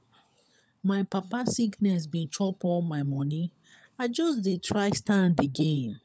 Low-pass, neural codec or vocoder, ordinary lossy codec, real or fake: none; codec, 16 kHz, 16 kbps, FunCodec, trained on LibriTTS, 50 frames a second; none; fake